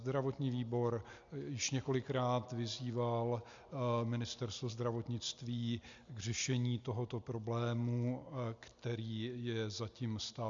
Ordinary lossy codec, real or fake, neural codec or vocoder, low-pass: MP3, 64 kbps; real; none; 7.2 kHz